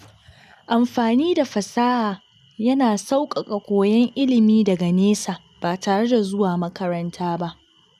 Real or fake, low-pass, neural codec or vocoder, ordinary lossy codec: real; 14.4 kHz; none; none